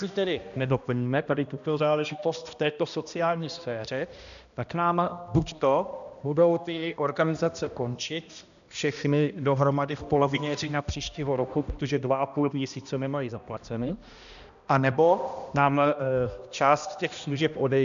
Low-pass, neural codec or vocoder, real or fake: 7.2 kHz; codec, 16 kHz, 1 kbps, X-Codec, HuBERT features, trained on balanced general audio; fake